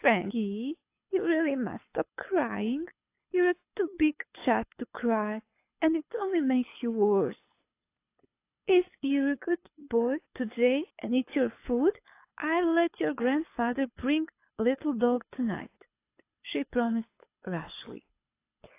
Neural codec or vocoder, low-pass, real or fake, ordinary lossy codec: codec, 24 kHz, 6 kbps, HILCodec; 3.6 kHz; fake; AAC, 24 kbps